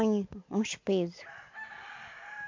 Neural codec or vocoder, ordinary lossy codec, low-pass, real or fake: none; MP3, 48 kbps; 7.2 kHz; real